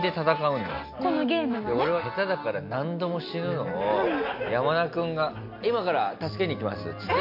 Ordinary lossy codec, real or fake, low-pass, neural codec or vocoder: none; real; 5.4 kHz; none